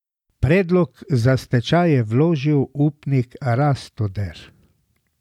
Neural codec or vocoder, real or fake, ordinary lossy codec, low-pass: none; real; none; 19.8 kHz